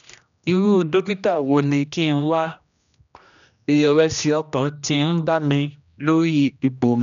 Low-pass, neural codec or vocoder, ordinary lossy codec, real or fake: 7.2 kHz; codec, 16 kHz, 1 kbps, X-Codec, HuBERT features, trained on general audio; none; fake